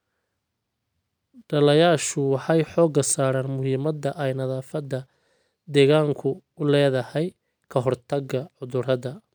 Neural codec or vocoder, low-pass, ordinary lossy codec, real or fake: none; none; none; real